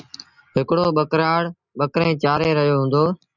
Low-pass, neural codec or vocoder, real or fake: 7.2 kHz; none; real